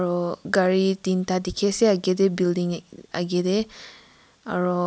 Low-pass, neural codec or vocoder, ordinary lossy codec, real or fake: none; none; none; real